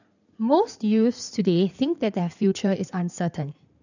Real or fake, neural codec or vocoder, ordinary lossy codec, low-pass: fake; codec, 16 kHz in and 24 kHz out, 2.2 kbps, FireRedTTS-2 codec; none; 7.2 kHz